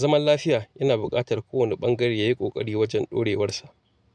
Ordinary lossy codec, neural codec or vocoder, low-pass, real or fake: none; none; none; real